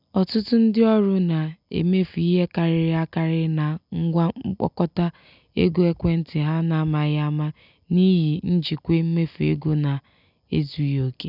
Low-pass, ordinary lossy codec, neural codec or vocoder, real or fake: 5.4 kHz; none; none; real